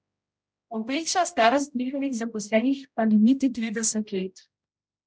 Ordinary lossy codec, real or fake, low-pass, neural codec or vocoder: none; fake; none; codec, 16 kHz, 0.5 kbps, X-Codec, HuBERT features, trained on general audio